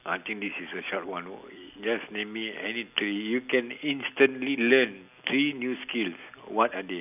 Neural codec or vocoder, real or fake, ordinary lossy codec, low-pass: none; real; none; 3.6 kHz